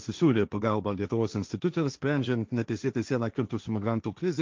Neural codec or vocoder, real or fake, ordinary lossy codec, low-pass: codec, 16 kHz, 1.1 kbps, Voila-Tokenizer; fake; Opus, 24 kbps; 7.2 kHz